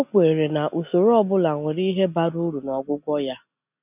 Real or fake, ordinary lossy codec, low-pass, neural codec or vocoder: real; none; 3.6 kHz; none